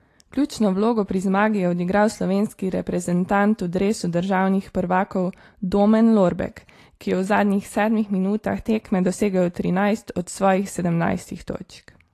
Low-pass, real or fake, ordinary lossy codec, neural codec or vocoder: 14.4 kHz; real; AAC, 48 kbps; none